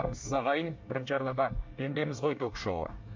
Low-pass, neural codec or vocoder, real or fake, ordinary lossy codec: 7.2 kHz; codec, 24 kHz, 1 kbps, SNAC; fake; MP3, 48 kbps